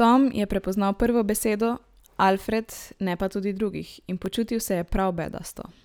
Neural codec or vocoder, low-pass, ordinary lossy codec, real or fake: none; none; none; real